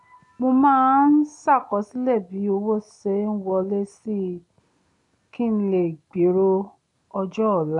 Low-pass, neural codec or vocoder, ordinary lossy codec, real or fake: 10.8 kHz; none; MP3, 96 kbps; real